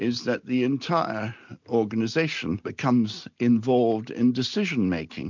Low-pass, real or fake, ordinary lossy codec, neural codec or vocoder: 7.2 kHz; real; MP3, 64 kbps; none